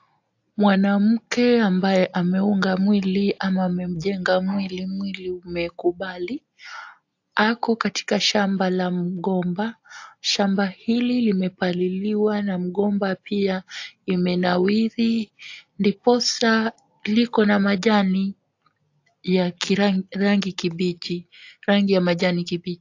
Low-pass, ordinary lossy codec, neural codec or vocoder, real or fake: 7.2 kHz; AAC, 48 kbps; none; real